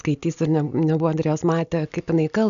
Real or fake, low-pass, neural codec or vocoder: real; 7.2 kHz; none